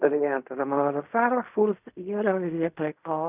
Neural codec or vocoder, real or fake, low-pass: codec, 16 kHz in and 24 kHz out, 0.4 kbps, LongCat-Audio-Codec, fine tuned four codebook decoder; fake; 3.6 kHz